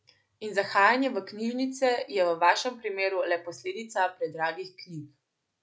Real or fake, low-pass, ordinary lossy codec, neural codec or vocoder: real; none; none; none